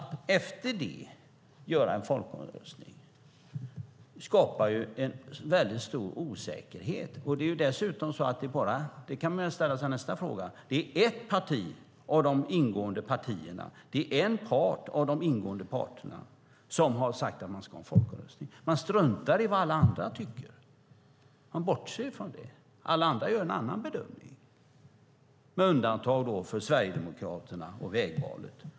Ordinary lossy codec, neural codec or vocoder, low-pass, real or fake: none; none; none; real